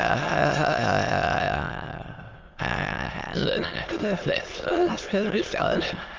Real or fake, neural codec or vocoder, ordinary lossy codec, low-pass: fake; autoencoder, 22.05 kHz, a latent of 192 numbers a frame, VITS, trained on many speakers; Opus, 32 kbps; 7.2 kHz